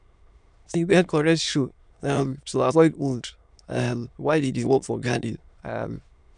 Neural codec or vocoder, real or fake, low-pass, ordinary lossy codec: autoencoder, 22.05 kHz, a latent of 192 numbers a frame, VITS, trained on many speakers; fake; 9.9 kHz; none